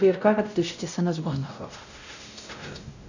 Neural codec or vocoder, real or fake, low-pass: codec, 16 kHz, 0.5 kbps, X-Codec, WavLM features, trained on Multilingual LibriSpeech; fake; 7.2 kHz